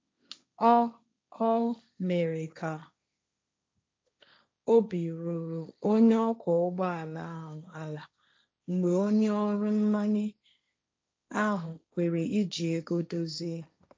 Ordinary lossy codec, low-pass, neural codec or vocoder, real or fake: none; none; codec, 16 kHz, 1.1 kbps, Voila-Tokenizer; fake